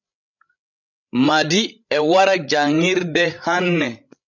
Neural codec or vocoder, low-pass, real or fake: codec, 16 kHz, 16 kbps, FreqCodec, larger model; 7.2 kHz; fake